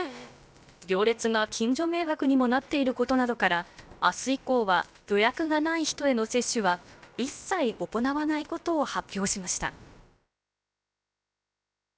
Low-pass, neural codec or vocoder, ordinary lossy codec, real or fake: none; codec, 16 kHz, about 1 kbps, DyCAST, with the encoder's durations; none; fake